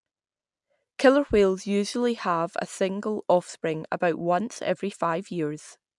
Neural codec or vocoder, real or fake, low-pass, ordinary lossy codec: none; real; 10.8 kHz; MP3, 96 kbps